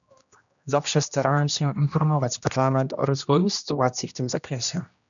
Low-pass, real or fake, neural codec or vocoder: 7.2 kHz; fake; codec, 16 kHz, 1 kbps, X-Codec, HuBERT features, trained on general audio